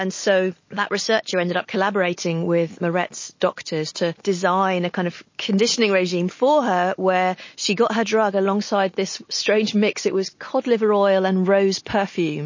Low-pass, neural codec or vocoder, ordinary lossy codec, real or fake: 7.2 kHz; none; MP3, 32 kbps; real